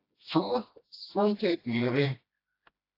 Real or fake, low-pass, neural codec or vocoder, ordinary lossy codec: fake; 5.4 kHz; codec, 16 kHz, 1 kbps, FreqCodec, smaller model; AAC, 32 kbps